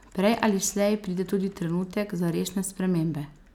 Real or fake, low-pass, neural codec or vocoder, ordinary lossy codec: real; 19.8 kHz; none; none